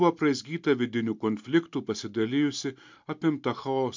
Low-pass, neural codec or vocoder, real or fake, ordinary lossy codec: 7.2 kHz; none; real; MP3, 64 kbps